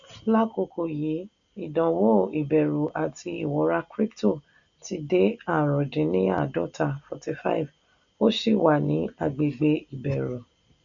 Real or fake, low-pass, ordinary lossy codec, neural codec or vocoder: real; 7.2 kHz; none; none